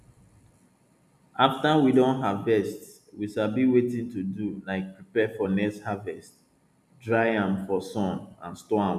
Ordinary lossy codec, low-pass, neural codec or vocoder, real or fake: none; 14.4 kHz; none; real